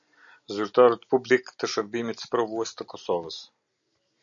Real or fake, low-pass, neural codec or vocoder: real; 7.2 kHz; none